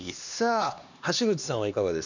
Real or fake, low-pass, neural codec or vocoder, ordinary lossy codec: fake; 7.2 kHz; codec, 16 kHz, 2 kbps, X-Codec, HuBERT features, trained on LibriSpeech; none